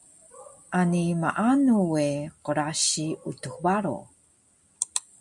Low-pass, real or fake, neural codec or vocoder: 10.8 kHz; real; none